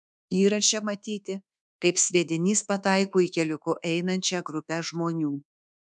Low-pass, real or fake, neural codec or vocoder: 10.8 kHz; fake; codec, 24 kHz, 1.2 kbps, DualCodec